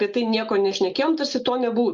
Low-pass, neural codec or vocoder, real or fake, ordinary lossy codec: 7.2 kHz; none; real; Opus, 24 kbps